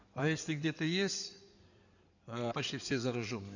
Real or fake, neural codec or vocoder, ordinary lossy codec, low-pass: fake; codec, 16 kHz in and 24 kHz out, 2.2 kbps, FireRedTTS-2 codec; none; 7.2 kHz